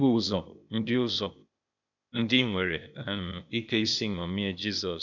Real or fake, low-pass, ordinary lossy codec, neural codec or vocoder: fake; 7.2 kHz; none; codec, 16 kHz, 0.8 kbps, ZipCodec